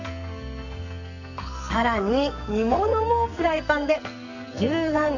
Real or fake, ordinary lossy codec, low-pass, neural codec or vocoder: fake; none; 7.2 kHz; codec, 44.1 kHz, 2.6 kbps, SNAC